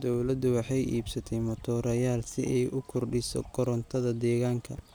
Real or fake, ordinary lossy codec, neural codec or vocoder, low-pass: real; none; none; none